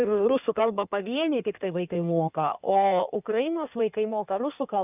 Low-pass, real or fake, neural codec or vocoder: 3.6 kHz; fake; codec, 16 kHz in and 24 kHz out, 1.1 kbps, FireRedTTS-2 codec